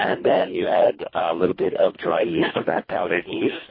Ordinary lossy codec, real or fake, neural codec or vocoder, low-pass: MP3, 24 kbps; fake; codec, 24 kHz, 1.5 kbps, HILCodec; 5.4 kHz